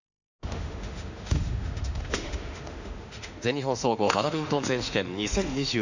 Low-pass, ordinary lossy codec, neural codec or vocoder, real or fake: 7.2 kHz; none; autoencoder, 48 kHz, 32 numbers a frame, DAC-VAE, trained on Japanese speech; fake